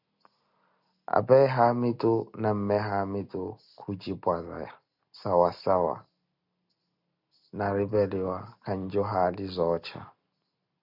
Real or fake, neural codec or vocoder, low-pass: real; none; 5.4 kHz